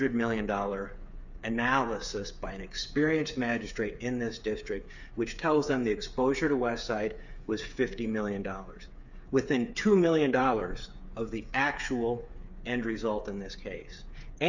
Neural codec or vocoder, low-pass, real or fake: codec, 16 kHz, 8 kbps, FreqCodec, smaller model; 7.2 kHz; fake